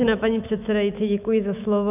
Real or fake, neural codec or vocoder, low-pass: fake; autoencoder, 48 kHz, 128 numbers a frame, DAC-VAE, trained on Japanese speech; 3.6 kHz